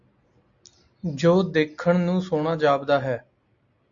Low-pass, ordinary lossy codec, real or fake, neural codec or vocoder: 7.2 kHz; AAC, 64 kbps; real; none